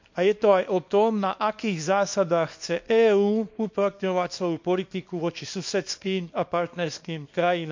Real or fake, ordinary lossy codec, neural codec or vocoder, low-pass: fake; MP3, 48 kbps; codec, 24 kHz, 0.9 kbps, WavTokenizer, small release; 7.2 kHz